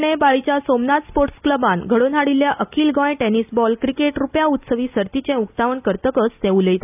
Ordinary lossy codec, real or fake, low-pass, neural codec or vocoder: none; real; 3.6 kHz; none